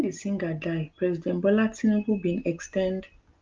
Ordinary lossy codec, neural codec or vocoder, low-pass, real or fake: Opus, 32 kbps; none; 7.2 kHz; real